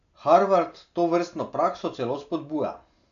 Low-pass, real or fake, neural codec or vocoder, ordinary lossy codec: 7.2 kHz; real; none; none